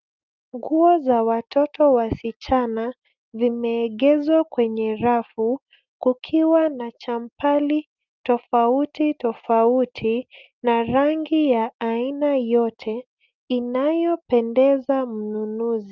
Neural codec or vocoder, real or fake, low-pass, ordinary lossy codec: none; real; 7.2 kHz; Opus, 24 kbps